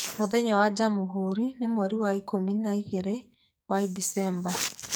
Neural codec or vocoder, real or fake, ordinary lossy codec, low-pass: codec, 44.1 kHz, 2.6 kbps, SNAC; fake; none; none